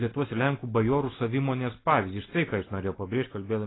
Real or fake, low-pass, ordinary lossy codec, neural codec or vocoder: real; 7.2 kHz; AAC, 16 kbps; none